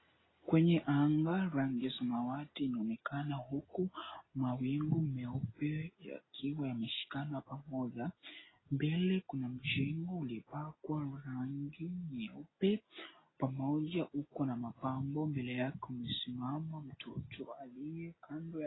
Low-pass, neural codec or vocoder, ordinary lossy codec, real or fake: 7.2 kHz; none; AAC, 16 kbps; real